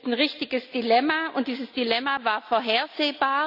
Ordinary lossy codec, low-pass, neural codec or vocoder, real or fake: none; 5.4 kHz; none; real